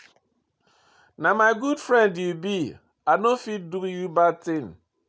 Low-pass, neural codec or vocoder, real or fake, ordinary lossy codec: none; none; real; none